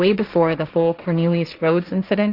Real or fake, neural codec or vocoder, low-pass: fake; codec, 16 kHz, 1.1 kbps, Voila-Tokenizer; 5.4 kHz